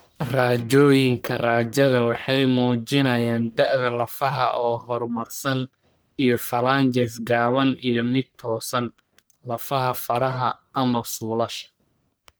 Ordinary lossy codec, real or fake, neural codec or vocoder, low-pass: none; fake; codec, 44.1 kHz, 1.7 kbps, Pupu-Codec; none